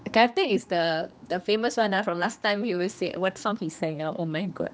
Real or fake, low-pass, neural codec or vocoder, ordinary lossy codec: fake; none; codec, 16 kHz, 2 kbps, X-Codec, HuBERT features, trained on general audio; none